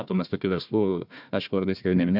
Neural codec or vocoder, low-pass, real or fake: codec, 16 kHz, 1 kbps, FunCodec, trained on Chinese and English, 50 frames a second; 5.4 kHz; fake